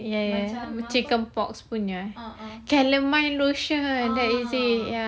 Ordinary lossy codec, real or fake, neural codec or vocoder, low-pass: none; real; none; none